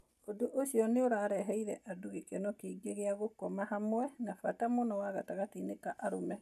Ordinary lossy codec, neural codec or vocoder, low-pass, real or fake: none; none; 14.4 kHz; real